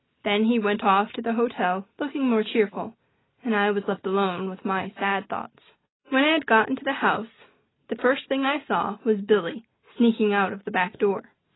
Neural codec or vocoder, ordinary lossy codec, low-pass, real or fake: none; AAC, 16 kbps; 7.2 kHz; real